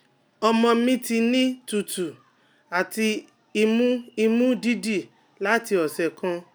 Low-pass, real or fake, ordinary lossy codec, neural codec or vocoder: none; real; none; none